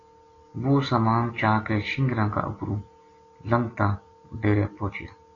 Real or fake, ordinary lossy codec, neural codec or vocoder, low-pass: real; AAC, 32 kbps; none; 7.2 kHz